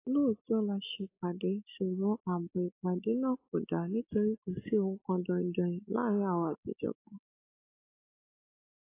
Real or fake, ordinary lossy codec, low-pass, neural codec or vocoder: real; AAC, 24 kbps; 3.6 kHz; none